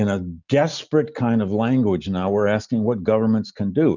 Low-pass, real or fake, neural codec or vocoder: 7.2 kHz; real; none